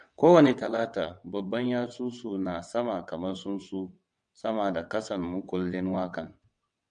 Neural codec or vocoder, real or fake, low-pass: vocoder, 22.05 kHz, 80 mel bands, WaveNeXt; fake; 9.9 kHz